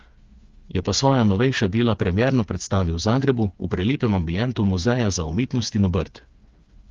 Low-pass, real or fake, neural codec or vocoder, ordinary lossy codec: 7.2 kHz; fake; codec, 16 kHz, 4 kbps, FreqCodec, smaller model; Opus, 32 kbps